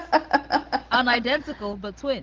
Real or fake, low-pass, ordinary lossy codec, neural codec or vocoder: real; 7.2 kHz; Opus, 16 kbps; none